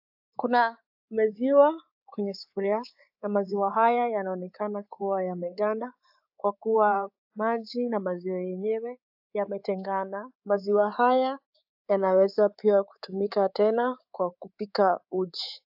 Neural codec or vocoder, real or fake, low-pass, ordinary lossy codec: codec, 24 kHz, 3.1 kbps, DualCodec; fake; 5.4 kHz; AAC, 48 kbps